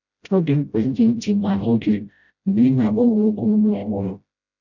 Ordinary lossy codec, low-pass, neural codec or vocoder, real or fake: none; 7.2 kHz; codec, 16 kHz, 0.5 kbps, FreqCodec, smaller model; fake